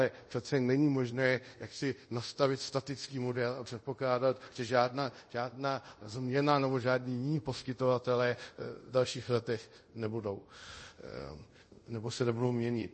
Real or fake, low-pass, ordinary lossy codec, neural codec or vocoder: fake; 10.8 kHz; MP3, 32 kbps; codec, 24 kHz, 0.5 kbps, DualCodec